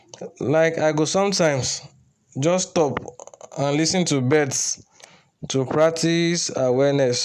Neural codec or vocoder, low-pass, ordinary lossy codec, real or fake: none; 14.4 kHz; none; real